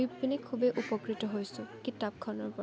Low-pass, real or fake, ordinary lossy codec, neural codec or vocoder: none; real; none; none